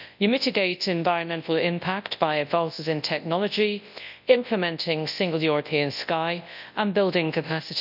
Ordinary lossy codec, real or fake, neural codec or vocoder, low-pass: none; fake; codec, 24 kHz, 0.9 kbps, WavTokenizer, large speech release; 5.4 kHz